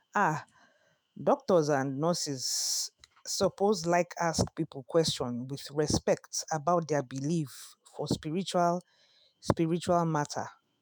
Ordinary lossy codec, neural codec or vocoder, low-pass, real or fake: none; autoencoder, 48 kHz, 128 numbers a frame, DAC-VAE, trained on Japanese speech; none; fake